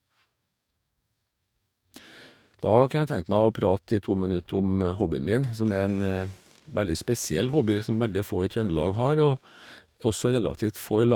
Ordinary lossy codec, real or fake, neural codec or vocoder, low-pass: none; fake; codec, 44.1 kHz, 2.6 kbps, DAC; 19.8 kHz